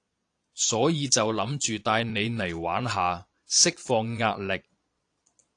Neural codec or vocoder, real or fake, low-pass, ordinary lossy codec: vocoder, 22.05 kHz, 80 mel bands, Vocos; fake; 9.9 kHz; AAC, 48 kbps